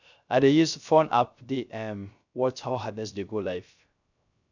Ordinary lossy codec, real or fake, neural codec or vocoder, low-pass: none; fake; codec, 16 kHz, 0.3 kbps, FocalCodec; 7.2 kHz